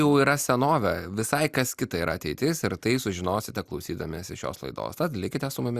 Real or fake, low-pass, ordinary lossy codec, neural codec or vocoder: real; 14.4 kHz; Opus, 64 kbps; none